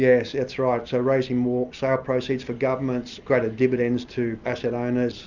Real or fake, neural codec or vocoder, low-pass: real; none; 7.2 kHz